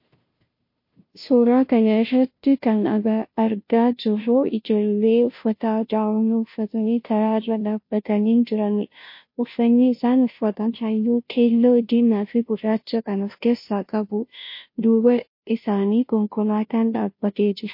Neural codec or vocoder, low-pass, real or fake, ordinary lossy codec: codec, 16 kHz, 0.5 kbps, FunCodec, trained on Chinese and English, 25 frames a second; 5.4 kHz; fake; MP3, 32 kbps